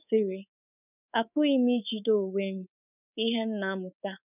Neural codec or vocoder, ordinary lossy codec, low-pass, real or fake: codec, 24 kHz, 3.1 kbps, DualCodec; none; 3.6 kHz; fake